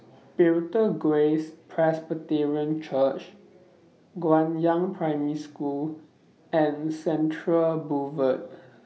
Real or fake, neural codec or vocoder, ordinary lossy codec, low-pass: real; none; none; none